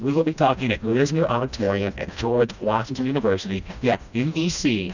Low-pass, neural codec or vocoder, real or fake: 7.2 kHz; codec, 16 kHz, 1 kbps, FreqCodec, smaller model; fake